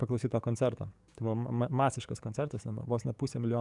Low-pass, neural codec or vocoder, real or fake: 10.8 kHz; codec, 44.1 kHz, 7.8 kbps, DAC; fake